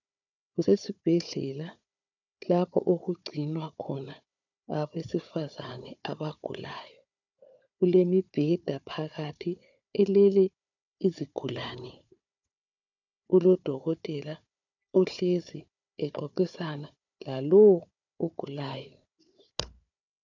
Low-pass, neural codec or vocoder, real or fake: 7.2 kHz; codec, 16 kHz, 4 kbps, FunCodec, trained on Chinese and English, 50 frames a second; fake